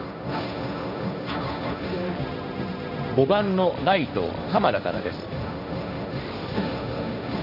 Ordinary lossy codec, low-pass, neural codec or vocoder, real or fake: none; 5.4 kHz; codec, 16 kHz, 1.1 kbps, Voila-Tokenizer; fake